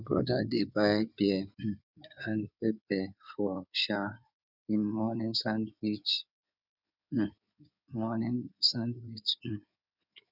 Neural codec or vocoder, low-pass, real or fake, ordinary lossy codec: codec, 16 kHz in and 24 kHz out, 2.2 kbps, FireRedTTS-2 codec; 5.4 kHz; fake; none